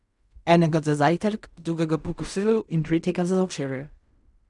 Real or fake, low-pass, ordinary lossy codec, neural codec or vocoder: fake; 10.8 kHz; none; codec, 16 kHz in and 24 kHz out, 0.4 kbps, LongCat-Audio-Codec, fine tuned four codebook decoder